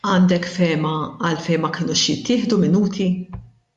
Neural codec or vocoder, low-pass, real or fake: none; 10.8 kHz; real